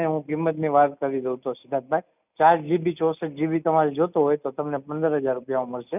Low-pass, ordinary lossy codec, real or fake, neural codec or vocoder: 3.6 kHz; none; real; none